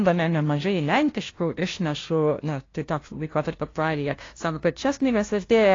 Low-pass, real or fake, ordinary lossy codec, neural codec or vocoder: 7.2 kHz; fake; AAC, 32 kbps; codec, 16 kHz, 0.5 kbps, FunCodec, trained on LibriTTS, 25 frames a second